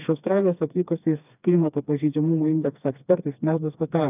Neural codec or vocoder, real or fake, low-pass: codec, 16 kHz, 2 kbps, FreqCodec, smaller model; fake; 3.6 kHz